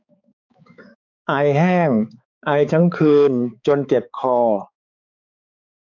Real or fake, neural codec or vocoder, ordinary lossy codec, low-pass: fake; codec, 16 kHz, 4 kbps, X-Codec, HuBERT features, trained on balanced general audio; none; 7.2 kHz